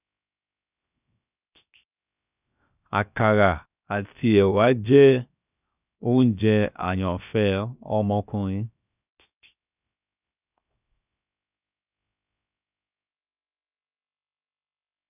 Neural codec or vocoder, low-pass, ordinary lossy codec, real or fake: codec, 16 kHz, 0.7 kbps, FocalCodec; 3.6 kHz; none; fake